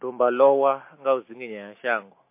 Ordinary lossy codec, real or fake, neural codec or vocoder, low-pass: MP3, 24 kbps; real; none; 3.6 kHz